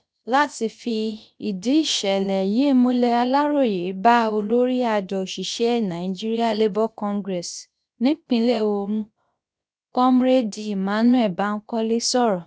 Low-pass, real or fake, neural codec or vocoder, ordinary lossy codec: none; fake; codec, 16 kHz, about 1 kbps, DyCAST, with the encoder's durations; none